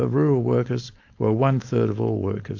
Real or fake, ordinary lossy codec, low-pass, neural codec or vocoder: fake; AAC, 48 kbps; 7.2 kHz; codec, 16 kHz, 4.8 kbps, FACodec